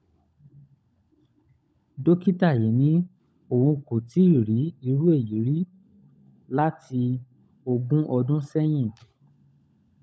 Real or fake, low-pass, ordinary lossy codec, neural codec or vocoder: fake; none; none; codec, 16 kHz, 16 kbps, FunCodec, trained on LibriTTS, 50 frames a second